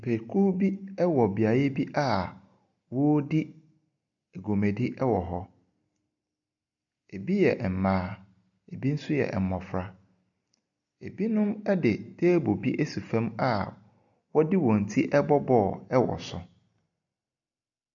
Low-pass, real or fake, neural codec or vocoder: 7.2 kHz; real; none